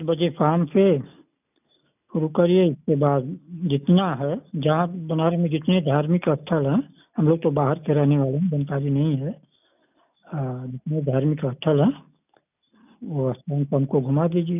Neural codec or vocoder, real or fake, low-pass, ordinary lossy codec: none; real; 3.6 kHz; none